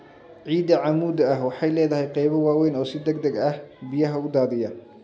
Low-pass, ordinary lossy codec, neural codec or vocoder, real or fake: none; none; none; real